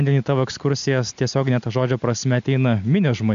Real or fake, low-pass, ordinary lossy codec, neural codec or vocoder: real; 7.2 kHz; MP3, 64 kbps; none